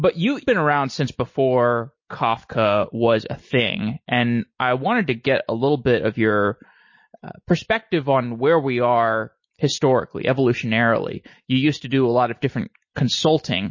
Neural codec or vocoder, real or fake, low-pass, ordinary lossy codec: none; real; 7.2 kHz; MP3, 32 kbps